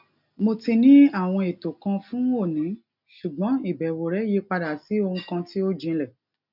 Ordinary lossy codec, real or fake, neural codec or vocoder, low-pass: none; real; none; 5.4 kHz